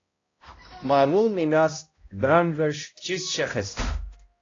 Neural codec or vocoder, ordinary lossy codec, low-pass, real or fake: codec, 16 kHz, 0.5 kbps, X-Codec, HuBERT features, trained on balanced general audio; AAC, 32 kbps; 7.2 kHz; fake